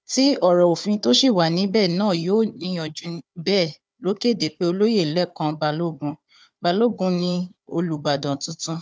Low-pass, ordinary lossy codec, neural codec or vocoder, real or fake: none; none; codec, 16 kHz, 4 kbps, FunCodec, trained on Chinese and English, 50 frames a second; fake